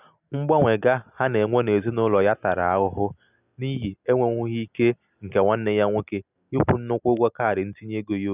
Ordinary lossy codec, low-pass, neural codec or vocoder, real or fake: none; 3.6 kHz; none; real